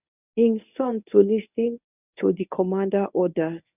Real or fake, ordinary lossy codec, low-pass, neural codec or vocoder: fake; none; 3.6 kHz; codec, 24 kHz, 0.9 kbps, WavTokenizer, medium speech release version 1